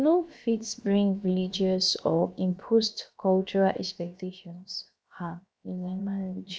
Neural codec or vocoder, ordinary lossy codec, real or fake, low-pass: codec, 16 kHz, 0.7 kbps, FocalCodec; none; fake; none